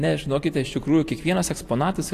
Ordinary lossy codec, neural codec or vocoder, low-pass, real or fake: AAC, 64 kbps; none; 14.4 kHz; real